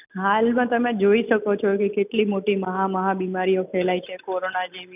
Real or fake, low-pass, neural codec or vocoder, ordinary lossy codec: real; 3.6 kHz; none; none